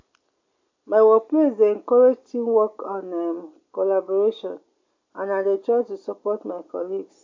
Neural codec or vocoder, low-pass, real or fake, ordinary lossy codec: none; 7.2 kHz; real; AAC, 48 kbps